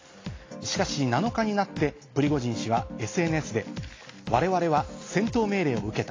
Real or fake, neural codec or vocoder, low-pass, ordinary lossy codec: real; none; 7.2 kHz; AAC, 32 kbps